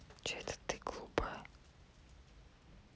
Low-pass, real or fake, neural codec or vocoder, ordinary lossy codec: none; real; none; none